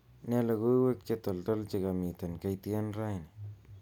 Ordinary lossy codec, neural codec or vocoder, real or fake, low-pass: none; none; real; 19.8 kHz